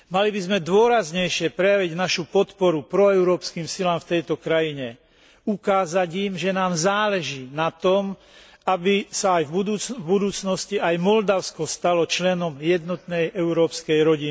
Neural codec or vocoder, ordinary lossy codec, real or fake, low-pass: none; none; real; none